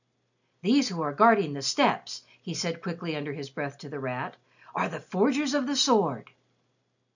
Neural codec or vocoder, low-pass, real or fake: none; 7.2 kHz; real